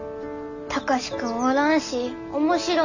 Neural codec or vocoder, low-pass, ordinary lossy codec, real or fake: none; 7.2 kHz; none; real